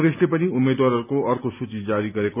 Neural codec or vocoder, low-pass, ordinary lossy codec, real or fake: none; 3.6 kHz; none; real